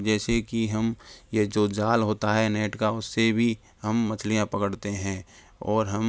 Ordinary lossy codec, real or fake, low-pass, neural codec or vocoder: none; real; none; none